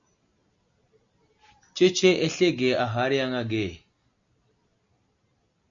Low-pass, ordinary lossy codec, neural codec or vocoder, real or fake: 7.2 kHz; MP3, 96 kbps; none; real